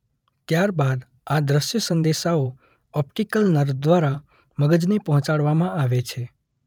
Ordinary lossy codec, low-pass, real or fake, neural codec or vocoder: none; 19.8 kHz; fake; vocoder, 44.1 kHz, 128 mel bands every 512 samples, BigVGAN v2